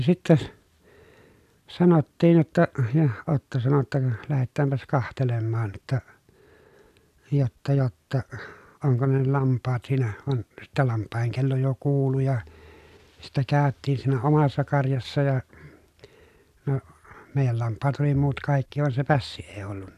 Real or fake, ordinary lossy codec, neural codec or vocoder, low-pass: real; none; none; 14.4 kHz